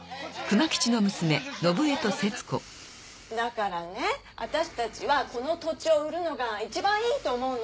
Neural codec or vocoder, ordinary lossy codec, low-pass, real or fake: none; none; none; real